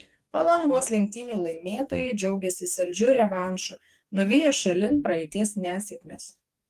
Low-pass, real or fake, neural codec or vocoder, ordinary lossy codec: 14.4 kHz; fake; codec, 44.1 kHz, 2.6 kbps, DAC; Opus, 32 kbps